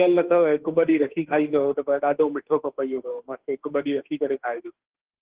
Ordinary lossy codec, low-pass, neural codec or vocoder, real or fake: Opus, 16 kbps; 3.6 kHz; autoencoder, 48 kHz, 32 numbers a frame, DAC-VAE, trained on Japanese speech; fake